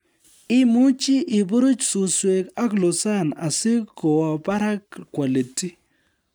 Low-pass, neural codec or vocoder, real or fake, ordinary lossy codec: none; none; real; none